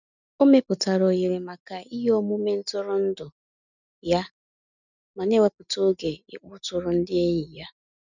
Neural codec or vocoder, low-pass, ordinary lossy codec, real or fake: none; 7.2 kHz; none; real